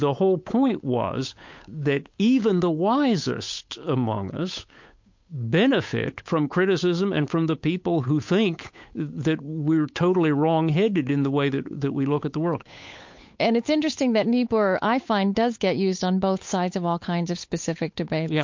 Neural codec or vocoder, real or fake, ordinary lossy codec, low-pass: codec, 16 kHz, 8 kbps, FunCodec, trained on Chinese and English, 25 frames a second; fake; MP3, 48 kbps; 7.2 kHz